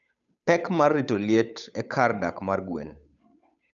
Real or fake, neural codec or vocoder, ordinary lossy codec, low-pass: fake; codec, 16 kHz, 8 kbps, FunCodec, trained on Chinese and English, 25 frames a second; none; 7.2 kHz